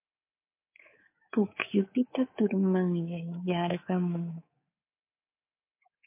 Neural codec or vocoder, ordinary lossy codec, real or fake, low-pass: codec, 24 kHz, 3.1 kbps, DualCodec; MP3, 24 kbps; fake; 3.6 kHz